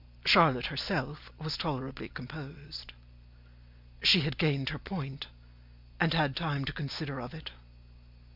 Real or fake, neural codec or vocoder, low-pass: real; none; 5.4 kHz